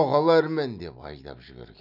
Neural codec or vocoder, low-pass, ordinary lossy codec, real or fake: none; 5.4 kHz; none; real